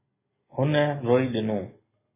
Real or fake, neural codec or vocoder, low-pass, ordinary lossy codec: real; none; 3.6 kHz; MP3, 16 kbps